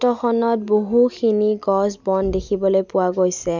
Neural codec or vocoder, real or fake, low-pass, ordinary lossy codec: none; real; 7.2 kHz; none